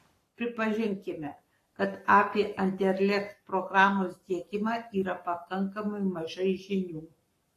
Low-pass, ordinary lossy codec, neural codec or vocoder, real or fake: 14.4 kHz; AAC, 48 kbps; codec, 44.1 kHz, 7.8 kbps, Pupu-Codec; fake